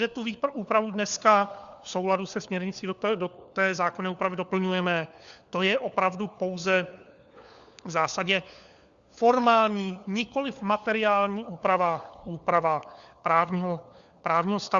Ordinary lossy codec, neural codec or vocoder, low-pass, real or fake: Opus, 64 kbps; codec, 16 kHz, 4 kbps, FunCodec, trained on LibriTTS, 50 frames a second; 7.2 kHz; fake